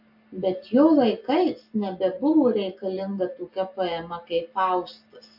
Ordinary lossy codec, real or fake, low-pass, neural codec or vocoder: AAC, 48 kbps; real; 5.4 kHz; none